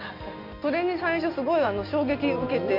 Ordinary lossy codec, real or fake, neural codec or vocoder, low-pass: none; real; none; 5.4 kHz